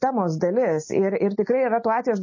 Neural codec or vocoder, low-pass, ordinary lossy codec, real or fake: none; 7.2 kHz; MP3, 32 kbps; real